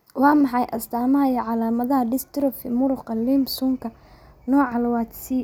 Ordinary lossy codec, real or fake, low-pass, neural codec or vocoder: none; real; none; none